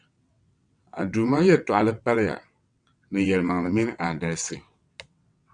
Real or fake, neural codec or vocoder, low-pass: fake; vocoder, 22.05 kHz, 80 mel bands, WaveNeXt; 9.9 kHz